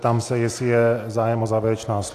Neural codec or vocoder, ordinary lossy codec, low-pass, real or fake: none; AAC, 64 kbps; 14.4 kHz; real